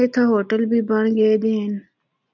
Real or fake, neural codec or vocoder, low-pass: real; none; 7.2 kHz